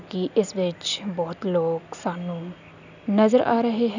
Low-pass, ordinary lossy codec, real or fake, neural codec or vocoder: 7.2 kHz; none; real; none